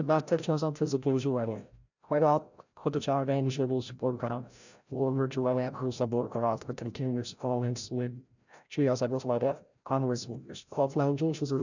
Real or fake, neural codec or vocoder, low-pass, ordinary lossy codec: fake; codec, 16 kHz, 0.5 kbps, FreqCodec, larger model; 7.2 kHz; none